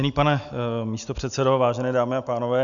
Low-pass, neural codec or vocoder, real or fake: 7.2 kHz; none; real